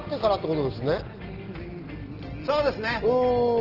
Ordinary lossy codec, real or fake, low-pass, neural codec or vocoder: Opus, 16 kbps; real; 5.4 kHz; none